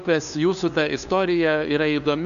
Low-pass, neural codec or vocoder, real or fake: 7.2 kHz; codec, 16 kHz, 2 kbps, FunCodec, trained on LibriTTS, 25 frames a second; fake